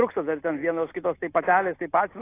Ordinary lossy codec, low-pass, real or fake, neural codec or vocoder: AAC, 24 kbps; 3.6 kHz; real; none